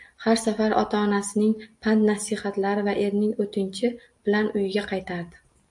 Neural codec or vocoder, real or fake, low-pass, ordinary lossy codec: none; real; 10.8 kHz; AAC, 64 kbps